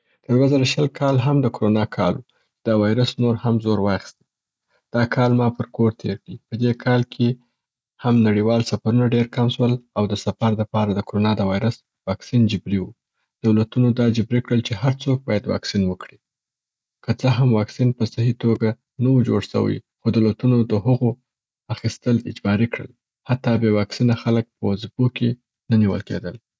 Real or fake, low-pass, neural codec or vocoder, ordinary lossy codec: real; none; none; none